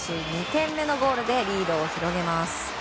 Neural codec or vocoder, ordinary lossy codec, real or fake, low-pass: none; none; real; none